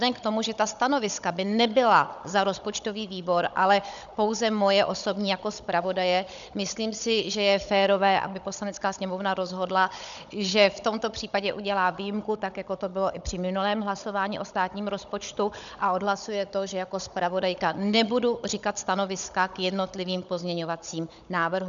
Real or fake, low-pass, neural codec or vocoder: fake; 7.2 kHz; codec, 16 kHz, 16 kbps, FunCodec, trained on Chinese and English, 50 frames a second